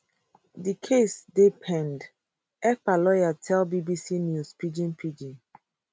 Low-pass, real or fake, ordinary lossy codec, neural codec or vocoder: none; real; none; none